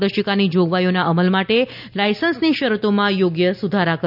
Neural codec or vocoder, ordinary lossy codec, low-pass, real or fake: none; none; 5.4 kHz; real